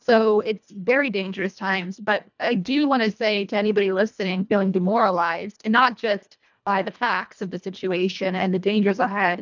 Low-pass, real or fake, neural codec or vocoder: 7.2 kHz; fake; codec, 24 kHz, 1.5 kbps, HILCodec